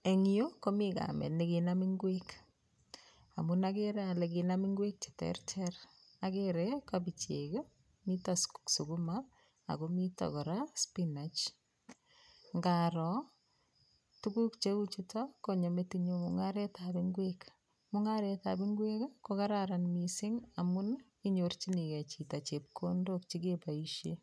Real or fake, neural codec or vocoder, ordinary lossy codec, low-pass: real; none; none; none